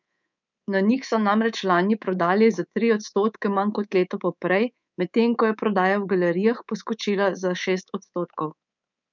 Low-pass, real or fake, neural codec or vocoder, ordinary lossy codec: 7.2 kHz; fake; codec, 24 kHz, 3.1 kbps, DualCodec; none